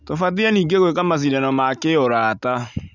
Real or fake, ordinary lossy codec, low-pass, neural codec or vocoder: real; none; 7.2 kHz; none